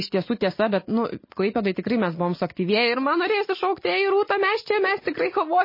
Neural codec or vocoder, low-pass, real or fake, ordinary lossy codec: none; 5.4 kHz; real; MP3, 24 kbps